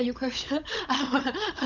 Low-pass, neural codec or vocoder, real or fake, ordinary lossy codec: 7.2 kHz; codec, 16 kHz, 16 kbps, FunCodec, trained on LibriTTS, 50 frames a second; fake; none